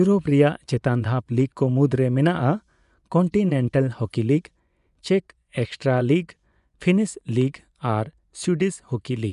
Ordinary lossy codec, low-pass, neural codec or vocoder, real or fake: none; 10.8 kHz; vocoder, 24 kHz, 100 mel bands, Vocos; fake